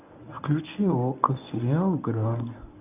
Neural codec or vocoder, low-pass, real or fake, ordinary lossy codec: codec, 24 kHz, 0.9 kbps, WavTokenizer, medium speech release version 1; 3.6 kHz; fake; none